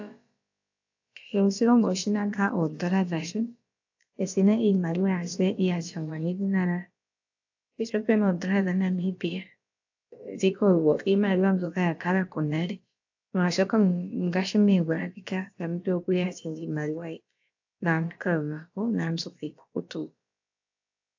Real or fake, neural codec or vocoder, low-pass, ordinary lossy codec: fake; codec, 16 kHz, about 1 kbps, DyCAST, with the encoder's durations; 7.2 kHz; AAC, 48 kbps